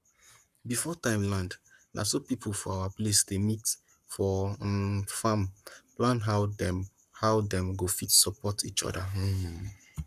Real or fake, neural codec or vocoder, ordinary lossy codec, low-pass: fake; codec, 44.1 kHz, 7.8 kbps, Pupu-Codec; none; 14.4 kHz